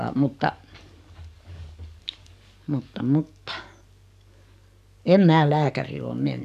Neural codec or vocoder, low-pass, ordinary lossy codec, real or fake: codec, 44.1 kHz, 7.8 kbps, DAC; 14.4 kHz; AAC, 96 kbps; fake